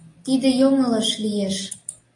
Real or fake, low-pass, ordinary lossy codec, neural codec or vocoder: real; 10.8 kHz; AAC, 48 kbps; none